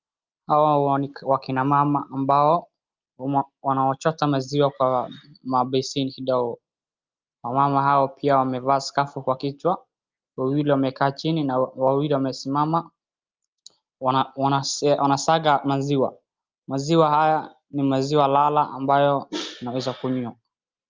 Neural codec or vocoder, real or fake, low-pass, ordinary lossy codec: none; real; 7.2 kHz; Opus, 24 kbps